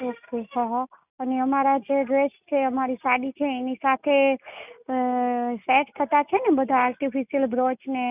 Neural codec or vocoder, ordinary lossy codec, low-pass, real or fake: none; none; 3.6 kHz; real